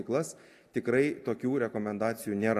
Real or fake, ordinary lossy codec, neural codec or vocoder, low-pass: real; AAC, 64 kbps; none; 14.4 kHz